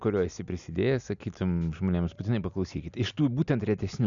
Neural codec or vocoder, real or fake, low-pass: none; real; 7.2 kHz